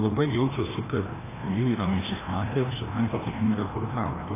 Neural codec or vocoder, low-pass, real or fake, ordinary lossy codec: codec, 16 kHz, 1 kbps, FreqCodec, larger model; 3.6 kHz; fake; AAC, 16 kbps